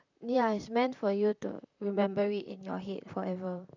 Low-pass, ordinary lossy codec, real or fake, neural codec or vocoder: 7.2 kHz; none; fake; vocoder, 44.1 kHz, 128 mel bands, Pupu-Vocoder